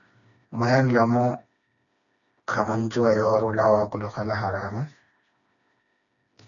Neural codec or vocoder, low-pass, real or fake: codec, 16 kHz, 2 kbps, FreqCodec, smaller model; 7.2 kHz; fake